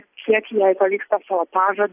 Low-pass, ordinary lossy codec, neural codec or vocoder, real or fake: 3.6 kHz; AAC, 32 kbps; none; real